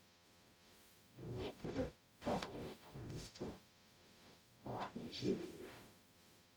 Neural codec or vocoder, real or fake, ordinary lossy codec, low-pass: codec, 44.1 kHz, 0.9 kbps, DAC; fake; none; none